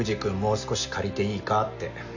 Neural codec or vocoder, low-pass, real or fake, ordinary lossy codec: none; 7.2 kHz; real; none